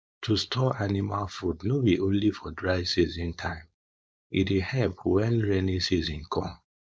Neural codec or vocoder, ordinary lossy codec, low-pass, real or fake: codec, 16 kHz, 4.8 kbps, FACodec; none; none; fake